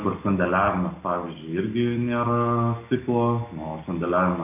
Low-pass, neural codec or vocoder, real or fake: 3.6 kHz; none; real